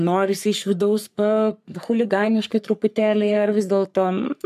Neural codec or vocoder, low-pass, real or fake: codec, 44.1 kHz, 3.4 kbps, Pupu-Codec; 14.4 kHz; fake